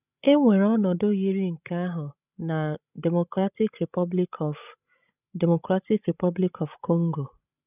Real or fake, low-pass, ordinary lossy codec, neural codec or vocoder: fake; 3.6 kHz; none; codec, 16 kHz, 16 kbps, FreqCodec, larger model